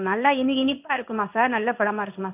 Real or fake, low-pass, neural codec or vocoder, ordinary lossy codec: fake; 3.6 kHz; codec, 16 kHz in and 24 kHz out, 1 kbps, XY-Tokenizer; none